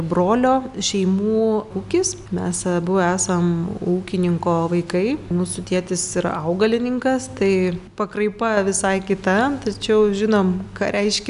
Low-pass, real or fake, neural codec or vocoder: 10.8 kHz; real; none